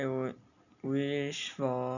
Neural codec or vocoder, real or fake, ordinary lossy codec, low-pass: none; real; none; 7.2 kHz